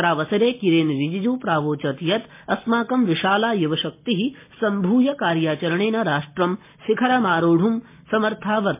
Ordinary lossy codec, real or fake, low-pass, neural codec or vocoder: MP3, 24 kbps; real; 3.6 kHz; none